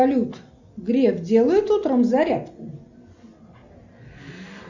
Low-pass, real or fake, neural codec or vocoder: 7.2 kHz; real; none